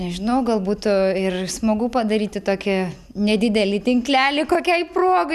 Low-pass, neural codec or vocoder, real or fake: 14.4 kHz; none; real